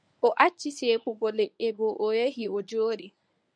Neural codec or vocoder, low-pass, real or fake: codec, 24 kHz, 0.9 kbps, WavTokenizer, medium speech release version 1; 9.9 kHz; fake